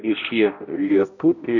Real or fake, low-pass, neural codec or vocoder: fake; 7.2 kHz; codec, 16 kHz, 0.5 kbps, X-Codec, HuBERT features, trained on balanced general audio